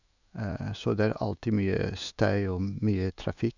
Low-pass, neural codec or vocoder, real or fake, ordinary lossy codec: 7.2 kHz; autoencoder, 48 kHz, 128 numbers a frame, DAC-VAE, trained on Japanese speech; fake; none